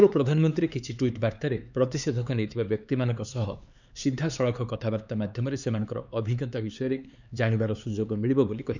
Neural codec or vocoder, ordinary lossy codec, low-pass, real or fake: codec, 16 kHz, 4 kbps, X-Codec, HuBERT features, trained on LibriSpeech; none; 7.2 kHz; fake